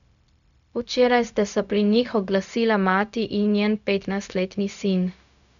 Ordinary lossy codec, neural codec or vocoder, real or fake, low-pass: none; codec, 16 kHz, 0.4 kbps, LongCat-Audio-Codec; fake; 7.2 kHz